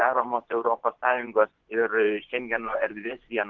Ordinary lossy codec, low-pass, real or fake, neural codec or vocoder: Opus, 16 kbps; 7.2 kHz; fake; vocoder, 24 kHz, 100 mel bands, Vocos